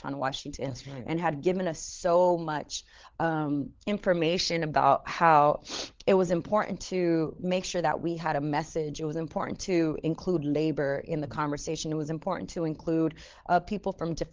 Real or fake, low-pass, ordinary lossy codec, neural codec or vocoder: fake; 7.2 kHz; Opus, 16 kbps; codec, 16 kHz, 16 kbps, FunCodec, trained on LibriTTS, 50 frames a second